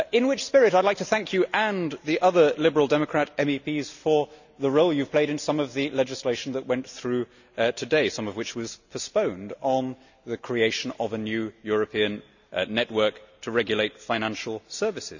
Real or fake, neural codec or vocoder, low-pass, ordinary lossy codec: real; none; 7.2 kHz; none